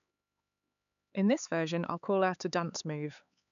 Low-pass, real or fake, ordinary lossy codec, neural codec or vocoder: 7.2 kHz; fake; none; codec, 16 kHz, 4 kbps, X-Codec, HuBERT features, trained on LibriSpeech